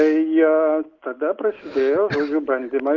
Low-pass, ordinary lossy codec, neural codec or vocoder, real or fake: 7.2 kHz; Opus, 24 kbps; none; real